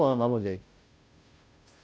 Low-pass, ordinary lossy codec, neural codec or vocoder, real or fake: none; none; codec, 16 kHz, 0.5 kbps, FunCodec, trained on Chinese and English, 25 frames a second; fake